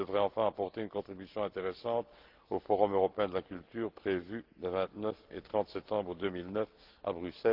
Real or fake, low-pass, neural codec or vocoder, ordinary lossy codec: real; 5.4 kHz; none; Opus, 16 kbps